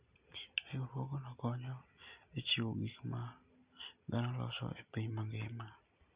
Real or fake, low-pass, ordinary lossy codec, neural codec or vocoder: real; 3.6 kHz; Opus, 64 kbps; none